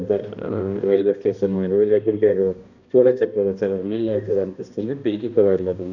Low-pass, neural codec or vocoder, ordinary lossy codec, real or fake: 7.2 kHz; codec, 16 kHz, 1 kbps, X-Codec, HuBERT features, trained on balanced general audio; none; fake